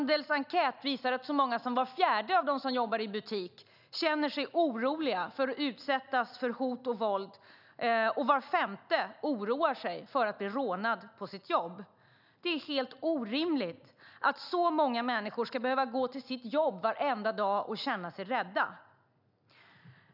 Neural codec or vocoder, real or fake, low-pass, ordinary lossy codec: none; real; 5.4 kHz; none